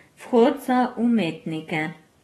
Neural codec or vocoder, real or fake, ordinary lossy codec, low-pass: codec, 44.1 kHz, 7.8 kbps, DAC; fake; AAC, 32 kbps; 19.8 kHz